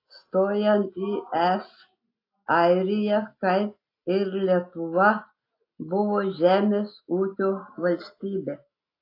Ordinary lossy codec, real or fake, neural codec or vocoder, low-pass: AAC, 32 kbps; real; none; 5.4 kHz